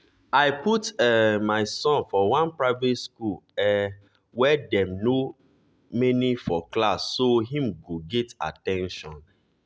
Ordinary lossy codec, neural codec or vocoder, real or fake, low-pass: none; none; real; none